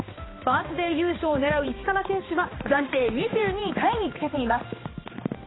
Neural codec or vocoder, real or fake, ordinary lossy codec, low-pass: codec, 16 kHz, 2 kbps, X-Codec, HuBERT features, trained on balanced general audio; fake; AAC, 16 kbps; 7.2 kHz